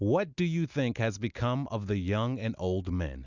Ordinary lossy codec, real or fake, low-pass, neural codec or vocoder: Opus, 64 kbps; real; 7.2 kHz; none